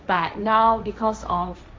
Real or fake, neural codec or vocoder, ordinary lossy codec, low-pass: fake; codec, 16 kHz, 1.1 kbps, Voila-Tokenizer; none; none